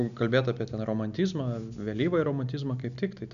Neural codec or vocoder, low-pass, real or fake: none; 7.2 kHz; real